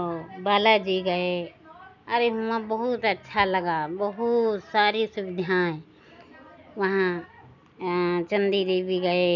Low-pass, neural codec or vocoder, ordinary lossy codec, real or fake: 7.2 kHz; none; none; real